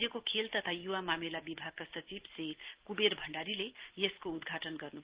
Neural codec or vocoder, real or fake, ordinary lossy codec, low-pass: none; real; Opus, 16 kbps; 3.6 kHz